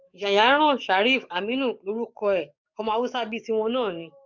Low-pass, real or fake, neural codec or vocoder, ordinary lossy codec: 7.2 kHz; fake; codec, 44.1 kHz, 7.8 kbps, DAC; none